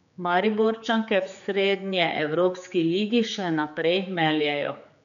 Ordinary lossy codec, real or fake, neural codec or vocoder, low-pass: none; fake; codec, 16 kHz, 4 kbps, X-Codec, HuBERT features, trained on general audio; 7.2 kHz